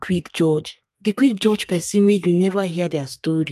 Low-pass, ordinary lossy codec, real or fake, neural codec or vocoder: 14.4 kHz; none; fake; codec, 32 kHz, 1.9 kbps, SNAC